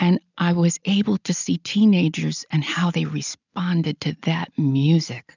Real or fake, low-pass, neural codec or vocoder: real; 7.2 kHz; none